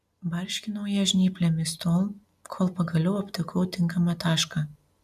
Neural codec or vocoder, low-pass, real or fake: none; 14.4 kHz; real